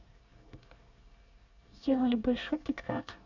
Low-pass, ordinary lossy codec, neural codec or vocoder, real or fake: 7.2 kHz; none; codec, 24 kHz, 1 kbps, SNAC; fake